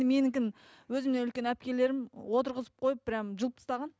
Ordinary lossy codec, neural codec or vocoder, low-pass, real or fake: none; none; none; real